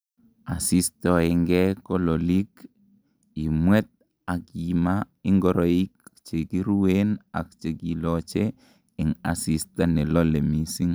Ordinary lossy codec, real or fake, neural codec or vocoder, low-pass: none; real; none; none